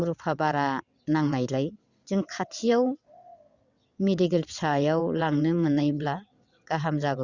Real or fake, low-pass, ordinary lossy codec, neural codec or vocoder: fake; 7.2 kHz; Opus, 64 kbps; vocoder, 22.05 kHz, 80 mel bands, WaveNeXt